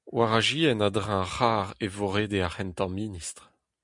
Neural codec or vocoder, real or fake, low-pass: none; real; 10.8 kHz